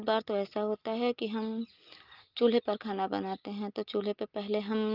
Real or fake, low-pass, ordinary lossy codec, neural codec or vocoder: real; 5.4 kHz; Opus, 32 kbps; none